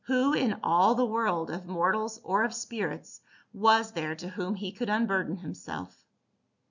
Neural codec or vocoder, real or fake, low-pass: none; real; 7.2 kHz